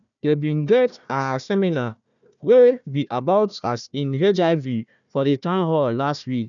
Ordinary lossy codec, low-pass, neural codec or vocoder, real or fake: none; 7.2 kHz; codec, 16 kHz, 1 kbps, FunCodec, trained on Chinese and English, 50 frames a second; fake